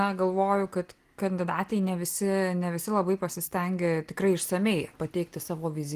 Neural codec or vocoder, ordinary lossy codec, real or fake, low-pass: none; Opus, 24 kbps; real; 14.4 kHz